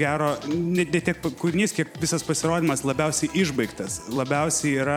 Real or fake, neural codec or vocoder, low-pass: fake; vocoder, 44.1 kHz, 128 mel bands every 256 samples, BigVGAN v2; 19.8 kHz